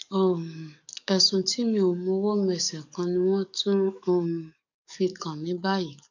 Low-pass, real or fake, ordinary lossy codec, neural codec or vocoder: 7.2 kHz; fake; none; codec, 44.1 kHz, 7.8 kbps, DAC